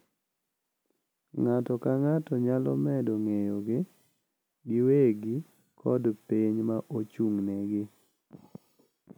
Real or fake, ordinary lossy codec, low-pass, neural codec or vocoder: real; none; none; none